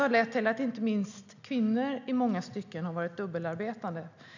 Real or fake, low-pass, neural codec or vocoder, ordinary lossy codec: real; 7.2 kHz; none; none